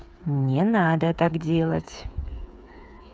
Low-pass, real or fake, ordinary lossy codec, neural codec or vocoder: none; fake; none; codec, 16 kHz, 8 kbps, FreqCodec, smaller model